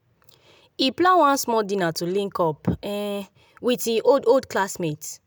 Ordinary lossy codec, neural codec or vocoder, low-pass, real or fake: none; none; none; real